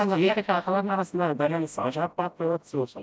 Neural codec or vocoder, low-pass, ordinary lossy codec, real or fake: codec, 16 kHz, 0.5 kbps, FreqCodec, smaller model; none; none; fake